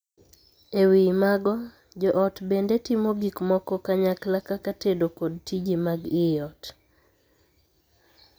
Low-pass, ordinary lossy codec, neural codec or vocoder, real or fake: none; none; none; real